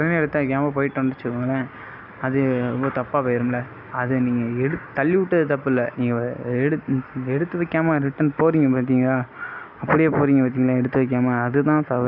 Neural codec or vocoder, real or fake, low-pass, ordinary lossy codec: none; real; 5.4 kHz; none